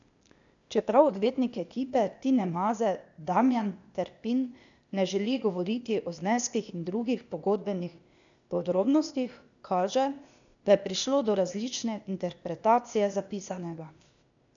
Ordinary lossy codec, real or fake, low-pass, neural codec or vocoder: none; fake; 7.2 kHz; codec, 16 kHz, 0.8 kbps, ZipCodec